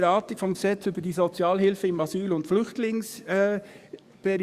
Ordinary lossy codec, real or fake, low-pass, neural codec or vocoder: Opus, 64 kbps; fake; 14.4 kHz; codec, 44.1 kHz, 7.8 kbps, DAC